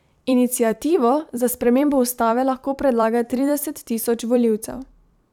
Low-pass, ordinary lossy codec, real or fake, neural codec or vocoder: 19.8 kHz; none; fake; vocoder, 44.1 kHz, 128 mel bands every 256 samples, BigVGAN v2